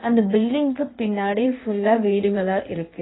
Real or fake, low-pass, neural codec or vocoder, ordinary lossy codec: fake; 7.2 kHz; codec, 16 kHz in and 24 kHz out, 1.1 kbps, FireRedTTS-2 codec; AAC, 16 kbps